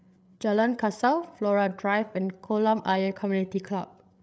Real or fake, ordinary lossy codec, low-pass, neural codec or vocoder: fake; none; none; codec, 16 kHz, 8 kbps, FreqCodec, larger model